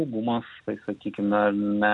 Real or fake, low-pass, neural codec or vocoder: real; 10.8 kHz; none